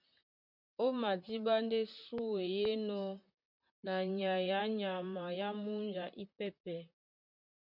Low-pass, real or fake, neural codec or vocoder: 5.4 kHz; fake; vocoder, 44.1 kHz, 128 mel bands, Pupu-Vocoder